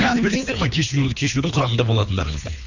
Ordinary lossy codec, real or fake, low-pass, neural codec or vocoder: none; fake; 7.2 kHz; codec, 24 kHz, 3 kbps, HILCodec